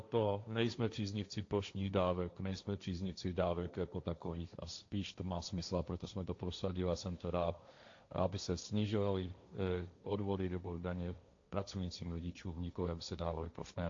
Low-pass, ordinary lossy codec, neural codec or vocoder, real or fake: 7.2 kHz; AAC, 48 kbps; codec, 16 kHz, 1.1 kbps, Voila-Tokenizer; fake